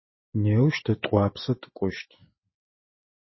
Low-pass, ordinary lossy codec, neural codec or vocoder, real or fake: 7.2 kHz; MP3, 24 kbps; none; real